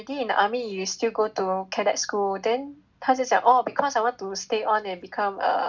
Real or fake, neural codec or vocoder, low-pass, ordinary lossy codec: real; none; 7.2 kHz; none